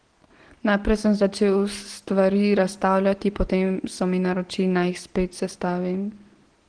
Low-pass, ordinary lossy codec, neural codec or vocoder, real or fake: 9.9 kHz; Opus, 16 kbps; none; real